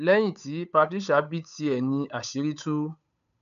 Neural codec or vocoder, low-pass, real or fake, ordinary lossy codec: codec, 16 kHz, 8 kbps, FunCodec, trained on Chinese and English, 25 frames a second; 7.2 kHz; fake; none